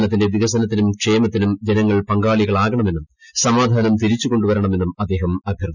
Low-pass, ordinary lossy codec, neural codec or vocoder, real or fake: 7.2 kHz; none; none; real